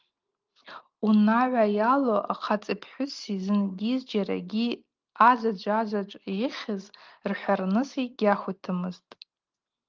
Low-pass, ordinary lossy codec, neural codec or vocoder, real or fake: 7.2 kHz; Opus, 32 kbps; none; real